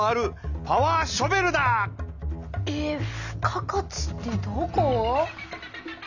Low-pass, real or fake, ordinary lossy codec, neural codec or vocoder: 7.2 kHz; real; none; none